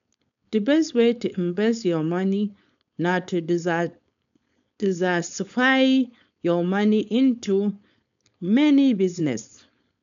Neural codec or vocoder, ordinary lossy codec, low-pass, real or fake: codec, 16 kHz, 4.8 kbps, FACodec; MP3, 96 kbps; 7.2 kHz; fake